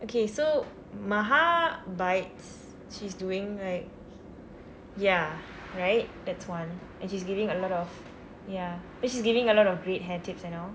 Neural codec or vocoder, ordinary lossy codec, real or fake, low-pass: none; none; real; none